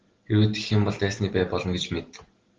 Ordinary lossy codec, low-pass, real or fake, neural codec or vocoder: Opus, 16 kbps; 7.2 kHz; real; none